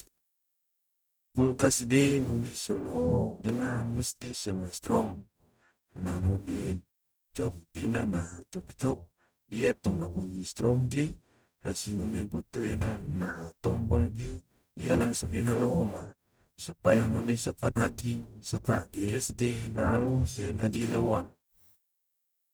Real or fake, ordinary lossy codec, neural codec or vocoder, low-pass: fake; none; codec, 44.1 kHz, 0.9 kbps, DAC; none